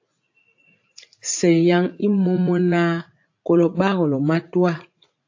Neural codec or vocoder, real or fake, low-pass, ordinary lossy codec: vocoder, 44.1 kHz, 80 mel bands, Vocos; fake; 7.2 kHz; AAC, 48 kbps